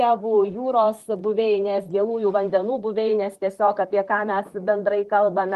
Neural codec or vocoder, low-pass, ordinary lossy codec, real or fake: vocoder, 44.1 kHz, 128 mel bands, Pupu-Vocoder; 14.4 kHz; Opus, 24 kbps; fake